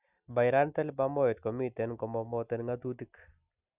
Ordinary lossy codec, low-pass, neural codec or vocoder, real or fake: none; 3.6 kHz; none; real